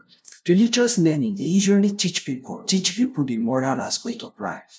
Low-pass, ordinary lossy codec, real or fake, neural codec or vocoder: none; none; fake; codec, 16 kHz, 0.5 kbps, FunCodec, trained on LibriTTS, 25 frames a second